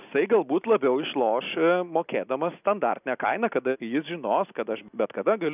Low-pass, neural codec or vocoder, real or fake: 3.6 kHz; none; real